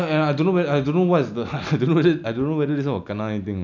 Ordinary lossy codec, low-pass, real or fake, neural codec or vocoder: none; 7.2 kHz; real; none